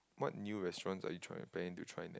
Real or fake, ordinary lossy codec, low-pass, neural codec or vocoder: real; none; none; none